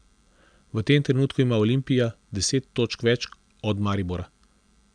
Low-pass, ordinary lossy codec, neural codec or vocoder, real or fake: 9.9 kHz; none; none; real